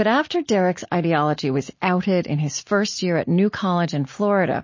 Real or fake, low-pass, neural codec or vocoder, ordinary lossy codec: real; 7.2 kHz; none; MP3, 32 kbps